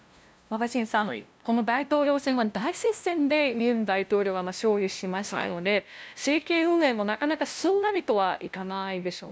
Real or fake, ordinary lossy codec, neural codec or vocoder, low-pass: fake; none; codec, 16 kHz, 0.5 kbps, FunCodec, trained on LibriTTS, 25 frames a second; none